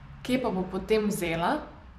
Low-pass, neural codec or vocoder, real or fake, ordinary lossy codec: 14.4 kHz; vocoder, 44.1 kHz, 128 mel bands every 256 samples, BigVGAN v2; fake; none